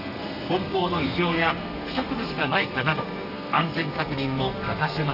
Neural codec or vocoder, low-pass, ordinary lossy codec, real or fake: codec, 32 kHz, 1.9 kbps, SNAC; 5.4 kHz; none; fake